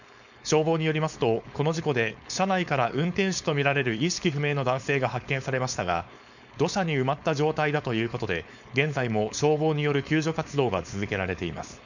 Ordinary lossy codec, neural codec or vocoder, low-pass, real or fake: none; codec, 16 kHz, 4.8 kbps, FACodec; 7.2 kHz; fake